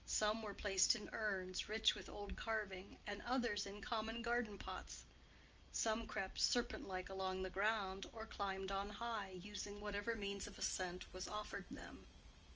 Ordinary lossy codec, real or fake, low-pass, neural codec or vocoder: Opus, 24 kbps; real; 7.2 kHz; none